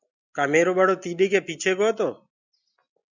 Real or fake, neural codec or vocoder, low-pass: real; none; 7.2 kHz